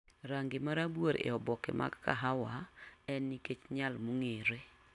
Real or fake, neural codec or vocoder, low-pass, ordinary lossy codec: real; none; 10.8 kHz; AAC, 64 kbps